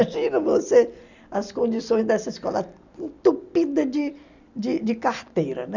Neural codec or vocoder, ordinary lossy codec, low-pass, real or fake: none; none; 7.2 kHz; real